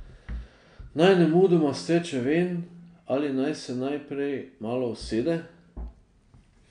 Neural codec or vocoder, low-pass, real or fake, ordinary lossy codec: none; 9.9 kHz; real; none